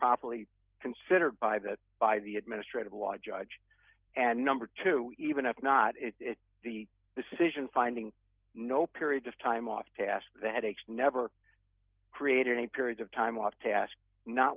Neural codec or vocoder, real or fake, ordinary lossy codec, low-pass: none; real; Opus, 32 kbps; 3.6 kHz